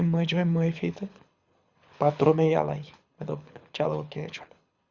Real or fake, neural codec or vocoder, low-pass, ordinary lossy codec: fake; codec, 24 kHz, 6 kbps, HILCodec; 7.2 kHz; Opus, 64 kbps